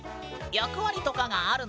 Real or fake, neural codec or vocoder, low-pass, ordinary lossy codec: real; none; none; none